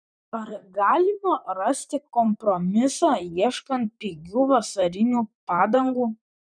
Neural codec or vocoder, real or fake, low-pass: vocoder, 44.1 kHz, 128 mel bands, Pupu-Vocoder; fake; 9.9 kHz